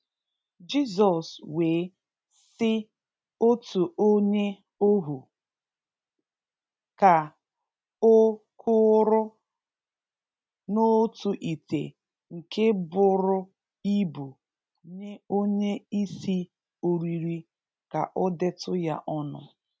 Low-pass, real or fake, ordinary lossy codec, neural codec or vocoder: none; real; none; none